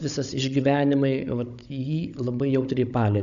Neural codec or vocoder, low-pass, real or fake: codec, 16 kHz, 16 kbps, FunCodec, trained on Chinese and English, 50 frames a second; 7.2 kHz; fake